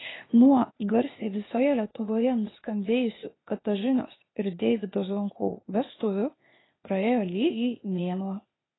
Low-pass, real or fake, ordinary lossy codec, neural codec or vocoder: 7.2 kHz; fake; AAC, 16 kbps; codec, 16 kHz, 0.8 kbps, ZipCodec